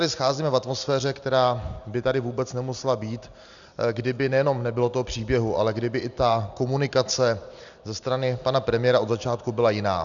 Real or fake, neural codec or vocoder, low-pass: real; none; 7.2 kHz